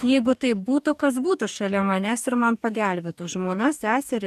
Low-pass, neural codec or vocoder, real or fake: 14.4 kHz; codec, 44.1 kHz, 2.6 kbps, DAC; fake